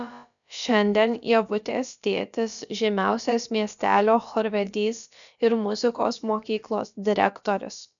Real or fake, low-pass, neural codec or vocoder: fake; 7.2 kHz; codec, 16 kHz, about 1 kbps, DyCAST, with the encoder's durations